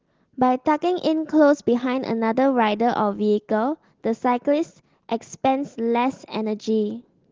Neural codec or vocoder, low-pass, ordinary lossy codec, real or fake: none; 7.2 kHz; Opus, 16 kbps; real